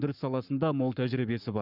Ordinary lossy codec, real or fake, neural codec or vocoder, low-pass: none; fake; codec, 44.1 kHz, 7.8 kbps, Pupu-Codec; 5.4 kHz